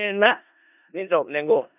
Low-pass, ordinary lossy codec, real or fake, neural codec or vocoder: 3.6 kHz; none; fake; codec, 16 kHz in and 24 kHz out, 0.4 kbps, LongCat-Audio-Codec, four codebook decoder